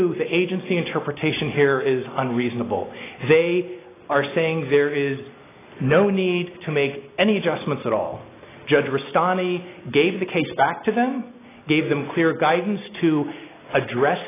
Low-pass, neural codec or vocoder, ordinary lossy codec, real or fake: 3.6 kHz; none; AAC, 16 kbps; real